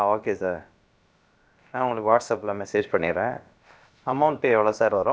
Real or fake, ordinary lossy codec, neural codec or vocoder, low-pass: fake; none; codec, 16 kHz, about 1 kbps, DyCAST, with the encoder's durations; none